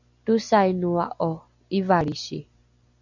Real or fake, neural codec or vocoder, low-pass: real; none; 7.2 kHz